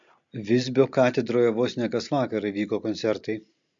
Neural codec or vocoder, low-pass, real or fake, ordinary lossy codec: none; 7.2 kHz; real; MP3, 64 kbps